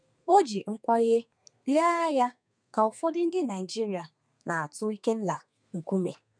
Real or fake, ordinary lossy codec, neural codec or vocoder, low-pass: fake; none; codec, 32 kHz, 1.9 kbps, SNAC; 9.9 kHz